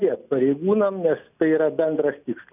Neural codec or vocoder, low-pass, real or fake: none; 3.6 kHz; real